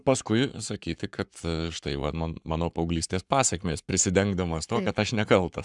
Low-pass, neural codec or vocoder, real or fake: 10.8 kHz; codec, 44.1 kHz, 7.8 kbps, Pupu-Codec; fake